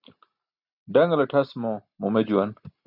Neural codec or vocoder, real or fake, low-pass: none; real; 5.4 kHz